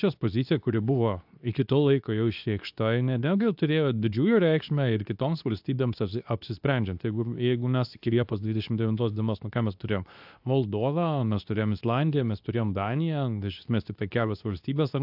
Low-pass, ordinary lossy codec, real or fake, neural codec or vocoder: 5.4 kHz; AAC, 48 kbps; fake; codec, 24 kHz, 0.9 kbps, WavTokenizer, medium speech release version 2